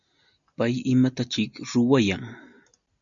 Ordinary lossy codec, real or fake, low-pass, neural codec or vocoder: MP3, 96 kbps; real; 7.2 kHz; none